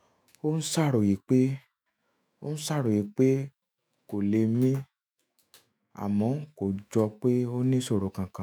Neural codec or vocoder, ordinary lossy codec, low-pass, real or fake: autoencoder, 48 kHz, 128 numbers a frame, DAC-VAE, trained on Japanese speech; none; none; fake